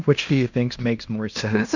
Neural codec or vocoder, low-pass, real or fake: codec, 16 kHz in and 24 kHz out, 0.9 kbps, LongCat-Audio-Codec, fine tuned four codebook decoder; 7.2 kHz; fake